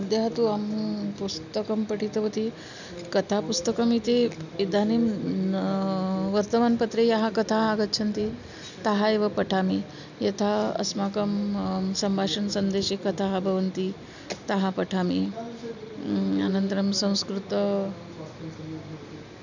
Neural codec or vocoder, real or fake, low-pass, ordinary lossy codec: none; real; 7.2 kHz; none